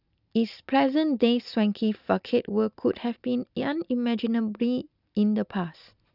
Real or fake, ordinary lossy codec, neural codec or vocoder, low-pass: real; none; none; 5.4 kHz